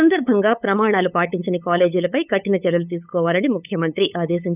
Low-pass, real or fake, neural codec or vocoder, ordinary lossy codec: 3.6 kHz; fake; codec, 16 kHz, 8 kbps, FunCodec, trained on LibriTTS, 25 frames a second; none